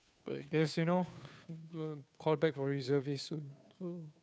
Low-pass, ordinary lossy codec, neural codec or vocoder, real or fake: none; none; codec, 16 kHz, 2 kbps, FunCodec, trained on Chinese and English, 25 frames a second; fake